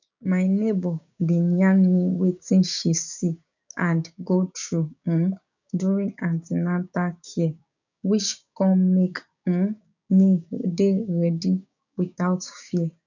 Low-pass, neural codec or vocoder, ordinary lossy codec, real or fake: 7.2 kHz; codec, 16 kHz, 6 kbps, DAC; none; fake